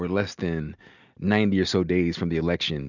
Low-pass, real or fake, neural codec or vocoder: 7.2 kHz; real; none